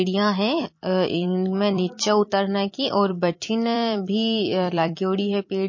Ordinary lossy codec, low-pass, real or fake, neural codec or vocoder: MP3, 32 kbps; 7.2 kHz; fake; vocoder, 44.1 kHz, 128 mel bands every 256 samples, BigVGAN v2